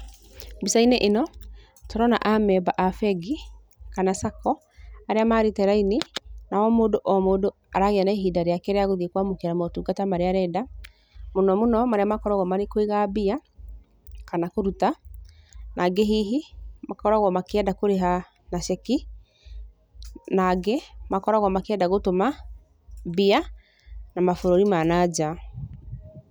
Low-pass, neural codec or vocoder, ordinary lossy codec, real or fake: none; none; none; real